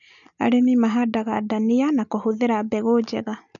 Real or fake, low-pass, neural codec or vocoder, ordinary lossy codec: real; 7.2 kHz; none; none